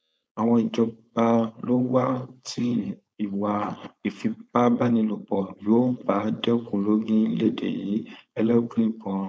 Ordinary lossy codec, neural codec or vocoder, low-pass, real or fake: none; codec, 16 kHz, 4.8 kbps, FACodec; none; fake